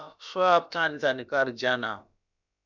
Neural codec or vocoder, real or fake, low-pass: codec, 16 kHz, about 1 kbps, DyCAST, with the encoder's durations; fake; 7.2 kHz